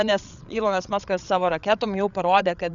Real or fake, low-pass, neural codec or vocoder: fake; 7.2 kHz; codec, 16 kHz, 8 kbps, FreqCodec, larger model